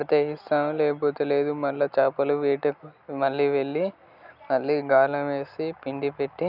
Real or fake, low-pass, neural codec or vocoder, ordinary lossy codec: real; 5.4 kHz; none; none